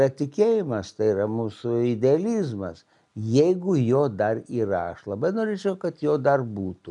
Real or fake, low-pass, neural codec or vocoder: real; 10.8 kHz; none